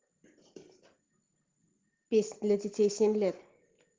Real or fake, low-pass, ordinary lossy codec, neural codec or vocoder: real; 7.2 kHz; Opus, 16 kbps; none